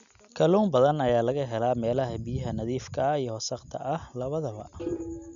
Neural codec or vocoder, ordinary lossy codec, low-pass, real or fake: none; none; 7.2 kHz; real